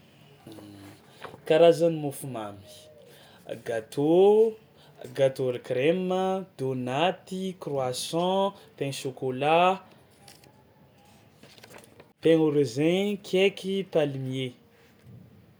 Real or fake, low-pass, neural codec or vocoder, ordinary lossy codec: real; none; none; none